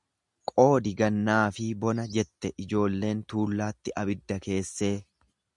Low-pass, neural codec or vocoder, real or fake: 10.8 kHz; none; real